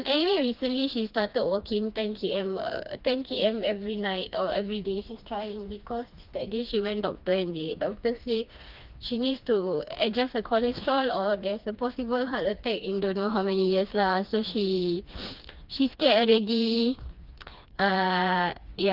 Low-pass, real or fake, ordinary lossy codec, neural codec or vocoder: 5.4 kHz; fake; Opus, 24 kbps; codec, 16 kHz, 2 kbps, FreqCodec, smaller model